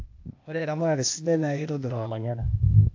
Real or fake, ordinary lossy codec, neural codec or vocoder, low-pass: fake; AAC, 48 kbps; codec, 16 kHz, 0.8 kbps, ZipCodec; 7.2 kHz